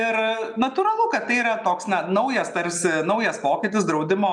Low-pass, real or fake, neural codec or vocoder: 10.8 kHz; real; none